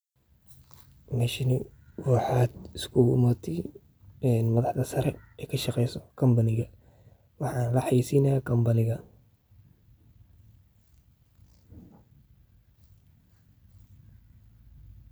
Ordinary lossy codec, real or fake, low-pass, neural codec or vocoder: none; real; none; none